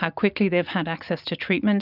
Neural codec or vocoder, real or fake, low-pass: none; real; 5.4 kHz